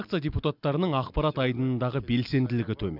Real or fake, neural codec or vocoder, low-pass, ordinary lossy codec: real; none; 5.4 kHz; none